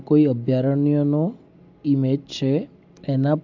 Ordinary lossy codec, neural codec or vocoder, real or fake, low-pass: AAC, 48 kbps; none; real; 7.2 kHz